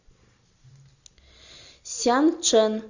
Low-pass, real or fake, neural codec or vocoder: 7.2 kHz; real; none